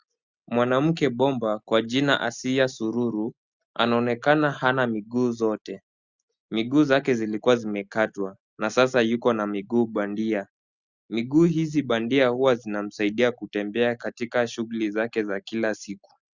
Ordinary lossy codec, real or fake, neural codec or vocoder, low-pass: Opus, 64 kbps; real; none; 7.2 kHz